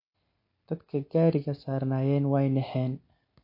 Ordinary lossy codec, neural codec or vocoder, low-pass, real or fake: MP3, 32 kbps; none; 5.4 kHz; real